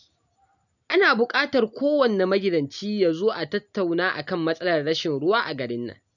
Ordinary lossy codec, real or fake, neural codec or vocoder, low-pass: none; real; none; 7.2 kHz